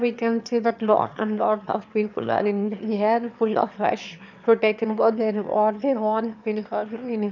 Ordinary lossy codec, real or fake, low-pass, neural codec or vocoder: none; fake; 7.2 kHz; autoencoder, 22.05 kHz, a latent of 192 numbers a frame, VITS, trained on one speaker